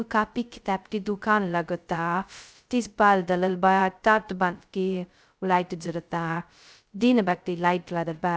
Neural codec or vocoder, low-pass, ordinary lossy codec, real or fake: codec, 16 kHz, 0.2 kbps, FocalCodec; none; none; fake